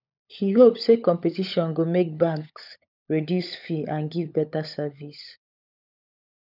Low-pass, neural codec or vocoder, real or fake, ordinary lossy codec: 5.4 kHz; codec, 16 kHz, 16 kbps, FunCodec, trained on LibriTTS, 50 frames a second; fake; none